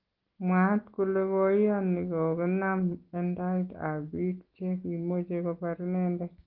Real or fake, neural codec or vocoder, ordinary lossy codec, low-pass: real; none; Opus, 16 kbps; 5.4 kHz